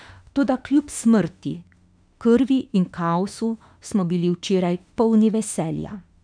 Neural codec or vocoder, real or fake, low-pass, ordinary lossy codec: autoencoder, 48 kHz, 32 numbers a frame, DAC-VAE, trained on Japanese speech; fake; 9.9 kHz; none